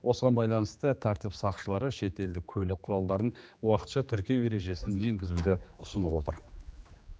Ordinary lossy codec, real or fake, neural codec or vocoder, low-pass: none; fake; codec, 16 kHz, 2 kbps, X-Codec, HuBERT features, trained on general audio; none